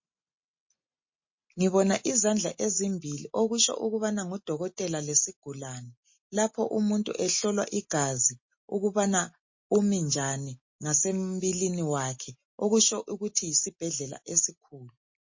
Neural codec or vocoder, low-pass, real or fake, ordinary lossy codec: none; 7.2 kHz; real; MP3, 32 kbps